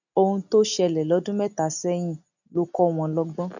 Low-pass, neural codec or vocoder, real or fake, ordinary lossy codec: 7.2 kHz; none; real; none